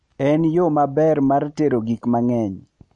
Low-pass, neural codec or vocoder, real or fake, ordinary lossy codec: 10.8 kHz; none; real; MP3, 48 kbps